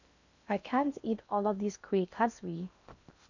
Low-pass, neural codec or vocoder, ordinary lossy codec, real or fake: 7.2 kHz; codec, 16 kHz in and 24 kHz out, 0.8 kbps, FocalCodec, streaming, 65536 codes; none; fake